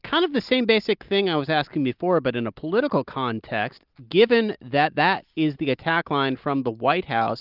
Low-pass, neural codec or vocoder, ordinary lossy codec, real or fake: 5.4 kHz; none; Opus, 24 kbps; real